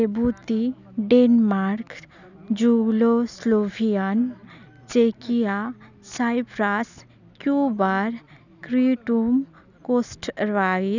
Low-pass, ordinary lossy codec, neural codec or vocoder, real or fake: 7.2 kHz; none; none; real